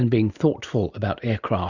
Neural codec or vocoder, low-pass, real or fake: none; 7.2 kHz; real